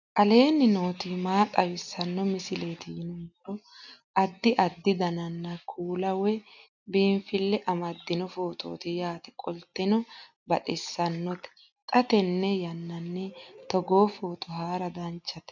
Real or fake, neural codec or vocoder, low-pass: real; none; 7.2 kHz